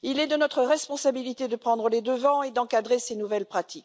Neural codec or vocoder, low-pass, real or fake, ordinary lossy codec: none; none; real; none